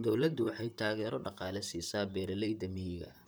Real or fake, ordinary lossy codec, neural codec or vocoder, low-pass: fake; none; vocoder, 44.1 kHz, 128 mel bands, Pupu-Vocoder; none